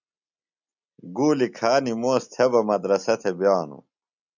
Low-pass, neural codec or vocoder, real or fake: 7.2 kHz; none; real